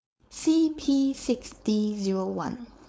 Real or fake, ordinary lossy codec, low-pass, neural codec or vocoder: fake; none; none; codec, 16 kHz, 4.8 kbps, FACodec